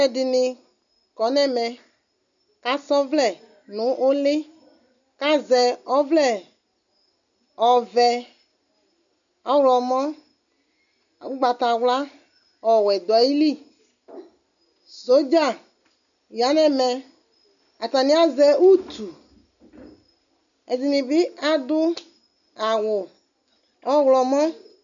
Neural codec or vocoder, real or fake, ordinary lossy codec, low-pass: none; real; MP3, 96 kbps; 7.2 kHz